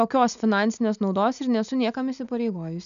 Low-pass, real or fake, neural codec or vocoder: 7.2 kHz; real; none